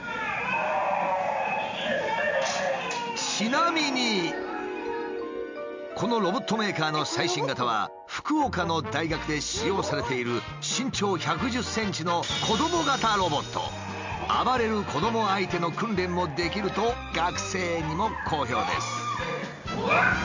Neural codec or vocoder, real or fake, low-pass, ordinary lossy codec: none; real; 7.2 kHz; MP3, 64 kbps